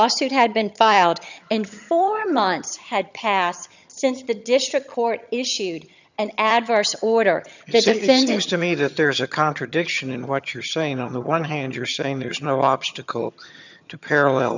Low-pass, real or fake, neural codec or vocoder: 7.2 kHz; fake; vocoder, 22.05 kHz, 80 mel bands, HiFi-GAN